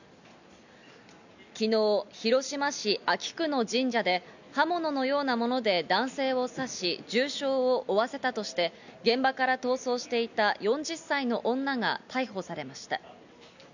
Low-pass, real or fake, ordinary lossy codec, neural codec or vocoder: 7.2 kHz; real; none; none